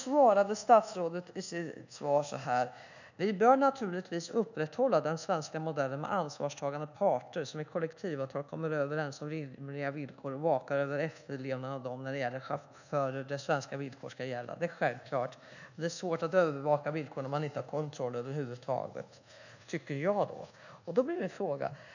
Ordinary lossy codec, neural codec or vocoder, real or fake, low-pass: none; codec, 24 kHz, 1.2 kbps, DualCodec; fake; 7.2 kHz